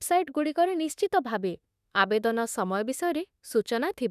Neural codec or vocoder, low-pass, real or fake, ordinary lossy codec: autoencoder, 48 kHz, 32 numbers a frame, DAC-VAE, trained on Japanese speech; 14.4 kHz; fake; none